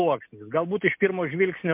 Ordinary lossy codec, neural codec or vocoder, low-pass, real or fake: MP3, 32 kbps; none; 3.6 kHz; real